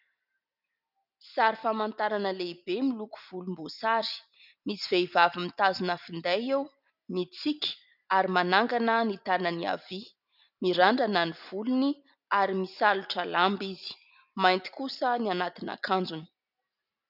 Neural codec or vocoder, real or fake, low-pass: none; real; 5.4 kHz